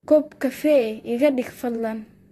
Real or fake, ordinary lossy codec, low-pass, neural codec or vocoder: fake; AAC, 48 kbps; 14.4 kHz; vocoder, 44.1 kHz, 128 mel bands, Pupu-Vocoder